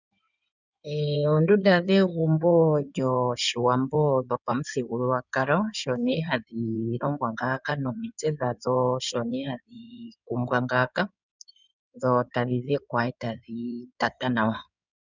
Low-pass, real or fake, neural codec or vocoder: 7.2 kHz; fake; codec, 16 kHz in and 24 kHz out, 2.2 kbps, FireRedTTS-2 codec